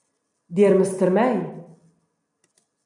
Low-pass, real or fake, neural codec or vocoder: 10.8 kHz; real; none